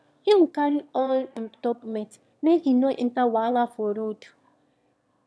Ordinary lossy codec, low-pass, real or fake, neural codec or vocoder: none; none; fake; autoencoder, 22.05 kHz, a latent of 192 numbers a frame, VITS, trained on one speaker